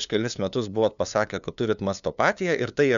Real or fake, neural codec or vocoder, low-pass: fake; codec, 16 kHz, 2 kbps, FunCodec, trained on LibriTTS, 25 frames a second; 7.2 kHz